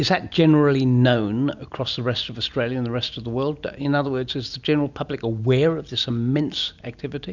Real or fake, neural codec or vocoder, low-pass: real; none; 7.2 kHz